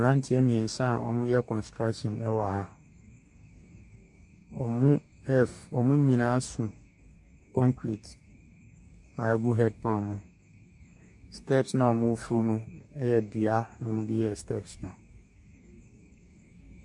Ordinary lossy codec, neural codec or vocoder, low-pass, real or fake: MP3, 64 kbps; codec, 44.1 kHz, 2.6 kbps, DAC; 10.8 kHz; fake